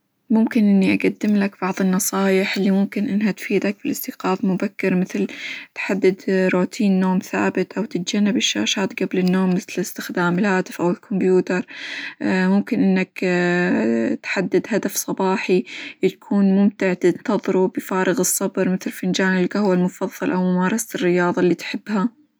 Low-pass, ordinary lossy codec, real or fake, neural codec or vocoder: none; none; real; none